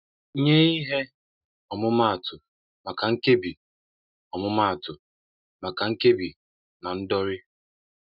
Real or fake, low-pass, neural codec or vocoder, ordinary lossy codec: real; 5.4 kHz; none; none